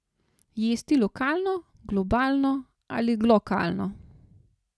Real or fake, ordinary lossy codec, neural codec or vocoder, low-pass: real; none; none; none